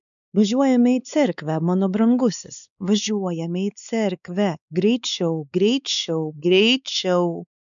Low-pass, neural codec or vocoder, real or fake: 7.2 kHz; codec, 16 kHz, 4 kbps, X-Codec, WavLM features, trained on Multilingual LibriSpeech; fake